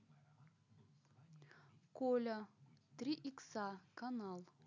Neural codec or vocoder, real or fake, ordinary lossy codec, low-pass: none; real; none; 7.2 kHz